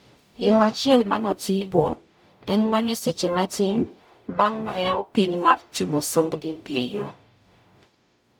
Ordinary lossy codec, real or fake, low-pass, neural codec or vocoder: none; fake; 19.8 kHz; codec, 44.1 kHz, 0.9 kbps, DAC